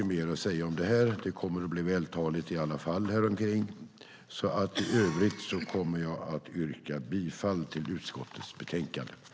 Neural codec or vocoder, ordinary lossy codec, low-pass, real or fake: none; none; none; real